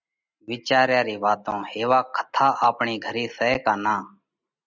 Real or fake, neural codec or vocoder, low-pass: real; none; 7.2 kHz